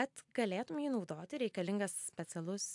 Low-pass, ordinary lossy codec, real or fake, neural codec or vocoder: 10.8 kHz; AAC, 64 kbps; real; none